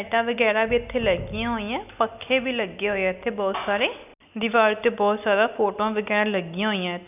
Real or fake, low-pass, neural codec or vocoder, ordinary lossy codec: real; 3.6 kHz; none; none